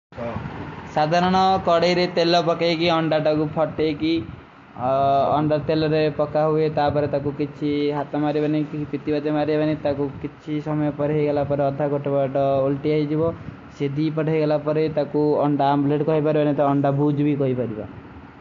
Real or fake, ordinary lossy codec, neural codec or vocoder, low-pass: real; AAC, 48 kbps; none; 7.2 kHz